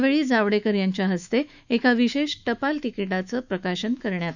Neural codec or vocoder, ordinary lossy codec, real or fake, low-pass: autoencoder, 48 kHz, 128 numbers a frame, DAC-VAE, trained on Japanese speech; none; fake; 7.2 kHz